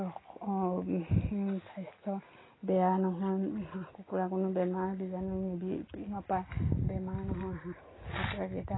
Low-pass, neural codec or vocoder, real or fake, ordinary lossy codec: 7.2 kHz; none; real; AAC, 16 kbps